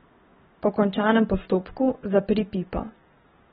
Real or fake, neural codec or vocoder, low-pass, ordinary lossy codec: real; none; 19.8 kHz; AAC, 16 kbps